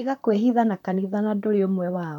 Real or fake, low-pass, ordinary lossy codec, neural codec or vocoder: fake; 19.8 kHz; none; vocoder, 44.1 kHz, 128 mel bands, Pupu-Vocoder